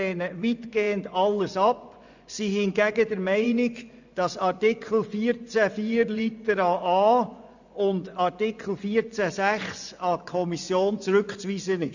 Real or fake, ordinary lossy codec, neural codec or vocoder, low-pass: fake; none; vocoder, 24 kHz, 100 mel bands, Vocos; 7.2 kHz